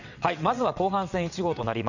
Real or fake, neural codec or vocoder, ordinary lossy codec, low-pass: fake; vocoder, 22.05 kHz, 80 mel bands, WaveNeXt; none; 7.2 kHz